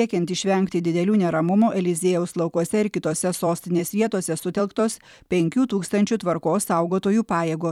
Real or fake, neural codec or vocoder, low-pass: fake; vocoder, 44.1 kHz, 128 mel bands every 256 samples, BigVGAN v2; 19.8 kHz